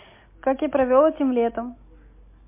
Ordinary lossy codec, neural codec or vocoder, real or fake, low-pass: MP3, 24 kbps; none; real; 3.6 kHz